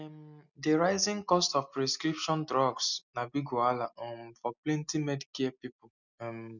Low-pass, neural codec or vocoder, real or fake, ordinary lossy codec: 7.2 kHz; none; real; none